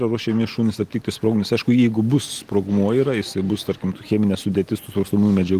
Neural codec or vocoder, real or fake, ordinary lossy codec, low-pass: none; real; Opus, 24 kbps; 14.4 kHz